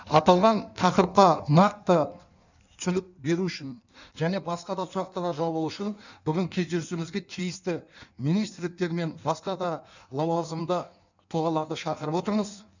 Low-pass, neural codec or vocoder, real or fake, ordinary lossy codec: 7.2 kHz; codec, 16 kHz in and 24 kHz out, 1.1 kbps, FireRedTTS-2 codec; fake; none